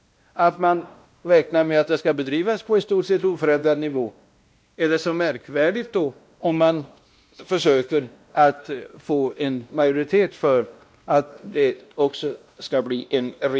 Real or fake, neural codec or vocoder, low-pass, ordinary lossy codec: fake; codec, 16 kHz, 1 kbps, X-Codec, WavLM features, trained on Multilingual LibriSpeech; none; none